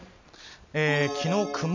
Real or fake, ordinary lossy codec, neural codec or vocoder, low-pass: real; MP3, 48 kbps; none; 7.2 kHz